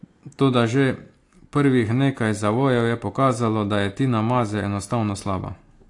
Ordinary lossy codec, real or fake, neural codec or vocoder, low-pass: AAC, 48 kbps; real; none; 10.8 kHz